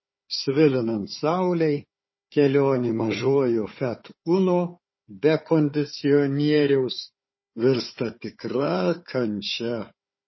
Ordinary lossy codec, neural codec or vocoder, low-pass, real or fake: MP3, 24 kbps; codec, 16 kHz, 4 kbps, FunCodec, trained on Chinese and English, 50 frames a second; 7.2 kHz; fake